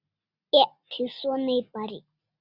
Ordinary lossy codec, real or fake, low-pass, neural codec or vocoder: Opus, 64 kbps; real; 5.4 kHz; none